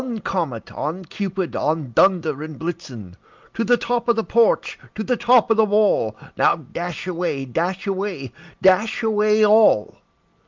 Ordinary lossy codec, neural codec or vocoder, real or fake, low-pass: Opus, 24 kbps; none; real; 7.2 kHz